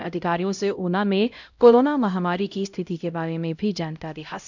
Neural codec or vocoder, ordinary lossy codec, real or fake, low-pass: codec, 16 kHz, 0.5 kbps, X-Codec, HuBERT features, trained on LibriSpeech; none; fake; 7.2 kHz